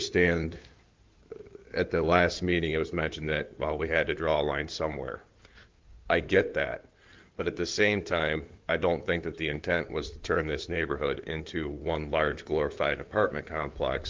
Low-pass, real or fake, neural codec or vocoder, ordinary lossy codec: 7.2 kHz; fake; codec, 16 kHz in and 24 kHz out, 2.2 kbps, FireRedTTS-2 codec; Opus, 32 kbps